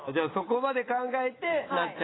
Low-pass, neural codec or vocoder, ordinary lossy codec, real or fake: 7.2 kHz; vocoder, 44.1 kHz, 128 mel bands every 256 samples, BigVGAN v2; AAC, 16 kbps; fake